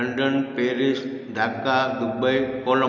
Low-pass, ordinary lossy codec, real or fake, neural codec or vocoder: 7.2 kHz; none; real; none